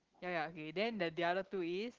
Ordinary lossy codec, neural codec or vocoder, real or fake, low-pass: Opus, 16 kbps; none; real; 7.2 kHz